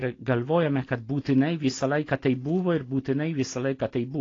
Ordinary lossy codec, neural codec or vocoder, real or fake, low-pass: AAC, 32 kbps; none; real; 7.2 kHz